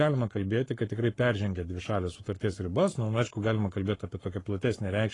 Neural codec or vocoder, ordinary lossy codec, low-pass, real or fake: codec, 44.1 kHz, 7.8 kbps, Pupu-Codec; AAC, 32 kbps; 10.8 kHz; fake